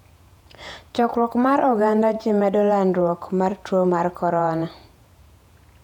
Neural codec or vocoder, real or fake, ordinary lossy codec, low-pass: vocoder, 48 kHz, 128 mel bands, Vocos; fake; none; 19.8 kHz